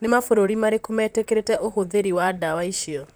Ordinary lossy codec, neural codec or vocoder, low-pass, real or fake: none; vocoder, 44.1 kHz, 128 mel bands, Pupu-Vocoder; none; fake